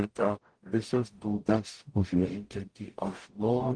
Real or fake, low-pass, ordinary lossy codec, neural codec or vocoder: fake; 9.9 kHz; Opus, 24 kbps; codec, 44.1 kHz, 0.9 kbps, DAC